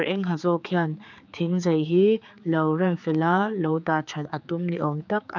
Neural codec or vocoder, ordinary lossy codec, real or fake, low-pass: codec, 16 kHz, 4 kbps, X-Codec, HuBERT features, trained on general audio; none; fake; 7.2 kHz